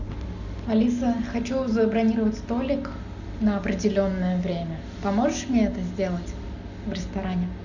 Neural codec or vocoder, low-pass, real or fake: none; 7.2 kHz; real